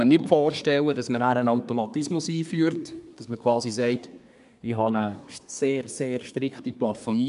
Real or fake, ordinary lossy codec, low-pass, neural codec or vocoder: fake; none; 10.8 kHz; codec, 24 kHz, 1 kbps, SNAC